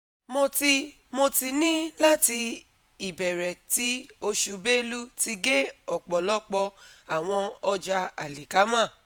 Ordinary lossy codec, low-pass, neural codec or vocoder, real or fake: none; none; vocoder, 48 kHz, 128 mel bands, Vocos; fake